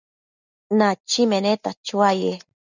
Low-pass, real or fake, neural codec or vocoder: 7.2 kHz; real; none